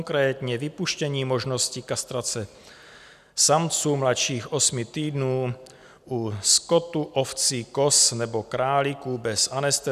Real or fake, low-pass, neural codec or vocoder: real; 14.4 kHz; none